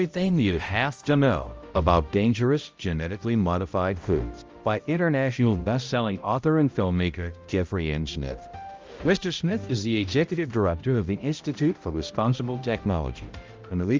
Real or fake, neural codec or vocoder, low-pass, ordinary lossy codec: fake; codec, 16 kHz, 0.5 kbps, X-Codec, HuBERT features, trained on balanced general audio; 7.2 kHz; Opus, 24 kbps